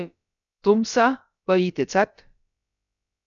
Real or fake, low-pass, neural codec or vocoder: fake; 7.2 kHz; codec, 16 kHz, about 1 kbps, DyCAST, with the encoder's durations